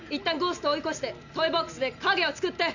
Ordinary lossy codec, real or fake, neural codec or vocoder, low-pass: none; real; none; 7.2 kHz